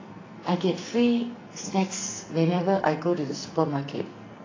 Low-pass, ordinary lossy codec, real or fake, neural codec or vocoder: 7.2 kHz; AAC, 32 kbps; fake; codec, 44.1 kHz, 2.6 kbps, SNAC